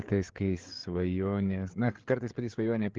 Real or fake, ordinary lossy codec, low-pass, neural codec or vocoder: fake; Opus, 16 kbps; 7.2 kHz; codec, 16 kHz, 8 kbps, FreqCodec, larger model